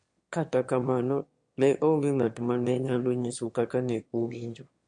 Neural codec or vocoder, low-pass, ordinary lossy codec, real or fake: autoencoder, 22.05 kHz, a latent of 192 numbers a frame, VITS, trained on one speaker; 9.9 kHz; MP3, 48 kbps; fake